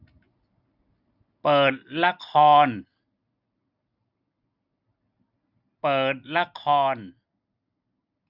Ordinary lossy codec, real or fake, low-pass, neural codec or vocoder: none; real; 5.4 kHz; none